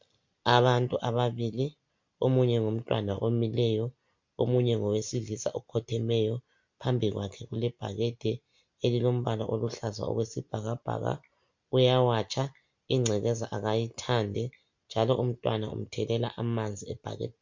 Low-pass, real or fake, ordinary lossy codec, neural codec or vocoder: 7.2 kHz; real; MP3, 48 kbps; none